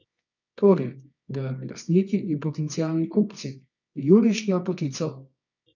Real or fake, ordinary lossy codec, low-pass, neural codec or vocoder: fake; none; 7.2 kHz; codec, 24 kHz, 0.9 kbps, WavTokenizer, medium music audio release